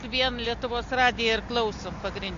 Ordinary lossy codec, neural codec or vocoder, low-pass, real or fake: MP3, 48 kbps; none; 7.2 kHz; real